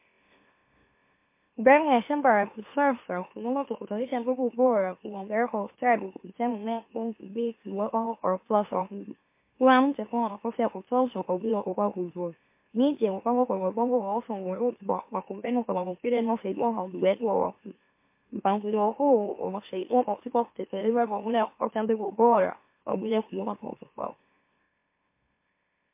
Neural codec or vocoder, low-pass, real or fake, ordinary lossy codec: autoencoder, 44.1 kHz, a latent of 192 numbers a frame, MeloTTS; 3.6 kHz; fake; MP3, 24 kbps